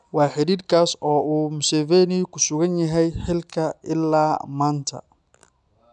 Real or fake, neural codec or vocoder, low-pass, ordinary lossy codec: real; none; 10.8 kHz; none